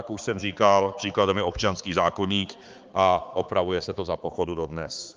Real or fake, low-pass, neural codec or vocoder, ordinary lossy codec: fake; 7.2 kHz; codec, 16 kHz, 4 kbps, X-Codec, HuBERT features, trained on balanced general audio; Opus, 32 kbps